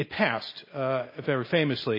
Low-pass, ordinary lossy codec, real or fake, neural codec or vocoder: 5.4 kHz; MP3, 24 kbps; real; none